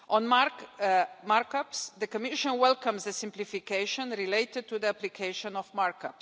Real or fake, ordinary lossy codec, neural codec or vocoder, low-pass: real; none; none; none